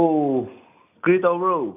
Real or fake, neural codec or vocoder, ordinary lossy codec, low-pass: real; none; AAC, 32 kbps; 3.6 kHz